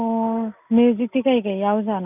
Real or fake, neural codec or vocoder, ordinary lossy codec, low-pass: real; none; none; 3.6 kHz